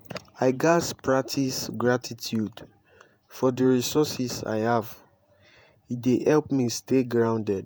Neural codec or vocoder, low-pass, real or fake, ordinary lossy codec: vocoder, 48 kHz, 128 mel bands, Vocos; none; fake; none